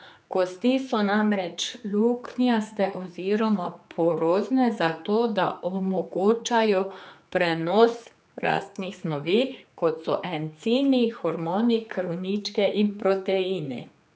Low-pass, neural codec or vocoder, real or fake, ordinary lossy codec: none; codec, 16 kHz, 4 kbps, X-Codec, HuBERT features, trained on general audio; fake; none